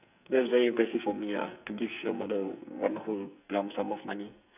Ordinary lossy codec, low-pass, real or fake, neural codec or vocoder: none; 3.6 kHz; fake; codec, 44.1 kHz, 2.6 kbps, SNAC